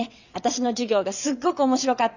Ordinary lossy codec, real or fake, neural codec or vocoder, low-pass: none; fake; vocoder, 22.05 kHz, 80 mel bands, WaveNeXt; 7.2 kHz